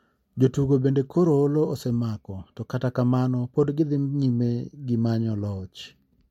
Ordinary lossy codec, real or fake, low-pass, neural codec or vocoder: MP3, 64 kbps; real; 19.8 kHz; none